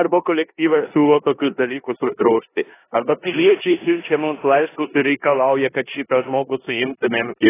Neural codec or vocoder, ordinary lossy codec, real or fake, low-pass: codec, 16 kHz in and 24 kHz out, 0.9 kbps, LongCat-Audio-Codec, four codebook decoder; AAC, 16 kbps; fake; 3.6 kHz